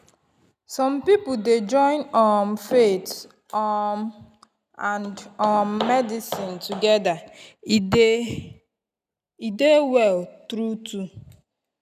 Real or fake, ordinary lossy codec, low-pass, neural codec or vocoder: real; none; 14.4 kHz; none